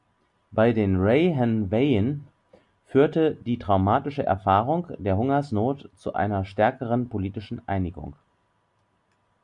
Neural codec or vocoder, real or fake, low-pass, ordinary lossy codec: none; real; 10.8 kHz; MP3, 64 kbps